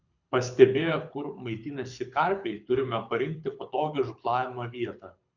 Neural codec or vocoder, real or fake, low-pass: codec, 24 kHz, 6 kbps, HILCodec; fake; 7.2 kHz